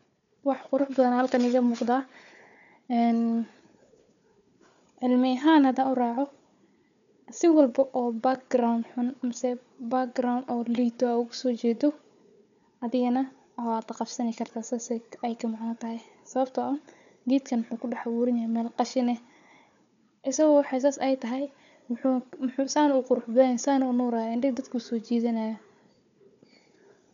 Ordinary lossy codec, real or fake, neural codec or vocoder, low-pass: none; fake; codec, 16 kHz, 4 kbps, FunCodec, trained on Chinese and English, 50 frames a second; 7.2 kHz